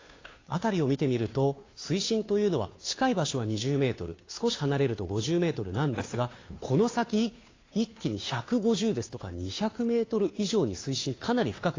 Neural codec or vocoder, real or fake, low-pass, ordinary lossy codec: codec, 16 kHz, 2 kbps, FunCodec, trained on Chinese and English, 25 frames a second; fake; 7.2 kHz; AAC, 32 kbps